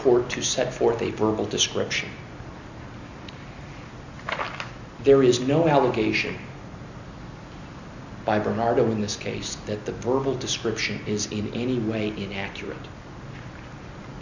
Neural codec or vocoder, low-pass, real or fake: none; 7.2 kHz; real